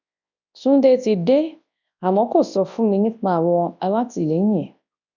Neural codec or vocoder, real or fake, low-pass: codec, 24 kHz, 0.9 kbps, WavTokenizer, large speech release; fake; 7.2 kHz